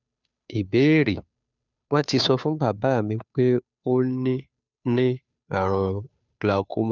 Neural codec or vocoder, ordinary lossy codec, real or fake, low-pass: codec, 16 kHz, 2 kbps, FunCodec, trained on Chinese and English, 25 frames a second; none; fake; 7.2 kHz